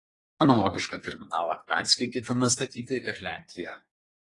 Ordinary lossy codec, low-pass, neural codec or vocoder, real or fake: AAC, 32 kbps; 10.8 kHz; codec, 24 kHz, 1 kbps, SNAC; fake